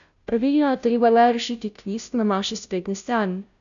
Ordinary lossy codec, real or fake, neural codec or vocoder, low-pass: none; fake; codec, 16 kHz, 0.5 kbps, FunCodec, trained on Chinese and English, 25 frames a second; 7.2 kHz